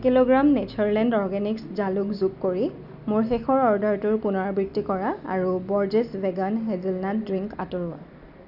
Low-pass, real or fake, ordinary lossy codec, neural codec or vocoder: 5.4 kHz; real; none; none